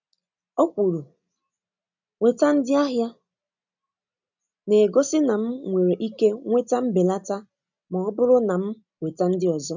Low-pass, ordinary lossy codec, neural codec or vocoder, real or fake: 7.2 kHz; none; none; real